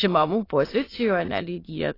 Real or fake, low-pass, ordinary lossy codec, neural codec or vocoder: fake; 5.4 kHz; AAC, 24 kbps; autoencoder, 22.05 kHz, a latent of 192 numbers a frame, VITS, trained on many speakers